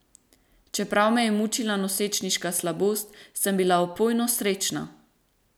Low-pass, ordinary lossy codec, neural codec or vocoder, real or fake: none; none; none; real